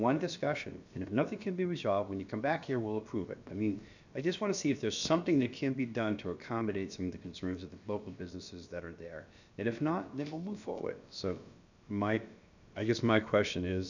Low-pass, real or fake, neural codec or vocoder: 7.2 kHz; fake; codec, 16 kHz, about 1 kbps, DyCAST, with the encoder's durations